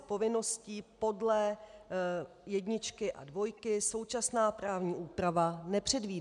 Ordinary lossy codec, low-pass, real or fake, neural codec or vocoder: MP3, 96 kbps; 10.8 kHz; real; none